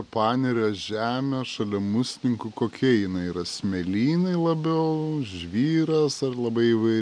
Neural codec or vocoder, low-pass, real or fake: none; 9.9 kHz; real